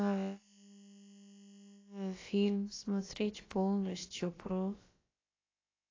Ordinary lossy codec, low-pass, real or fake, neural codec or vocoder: AAC, 32 kbps; 7.2 kHz; fake; codec, 16 kHz, about 1 kbps, DyCAST, with the encoder's durations